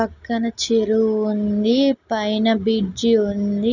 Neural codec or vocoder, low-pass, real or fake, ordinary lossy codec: none; 7.2 kHz; real; none